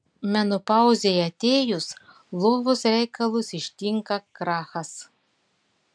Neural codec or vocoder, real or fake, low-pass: none; real; 9.9 kHz